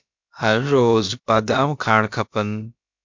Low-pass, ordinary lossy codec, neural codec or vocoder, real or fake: 7.2 kHz; MP3, 64 kbps; codec, 16 kHz, about 1 kbps, DyCAST, with the encoder's durations; fake